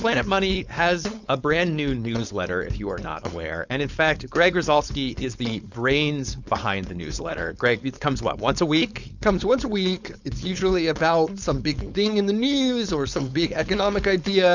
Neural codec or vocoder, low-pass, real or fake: codec, 16 kHz, 4.8 kbps, FACodec; 7.2 kHz; fake